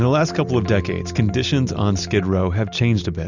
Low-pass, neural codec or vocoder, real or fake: 7.2 kHz; none; real